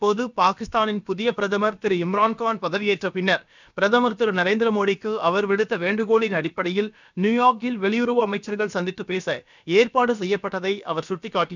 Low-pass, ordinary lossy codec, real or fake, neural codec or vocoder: 7.2 kHz; none; fake; codec, 16 kHz, about 1 kbps, DyCAST, with the encoder's durations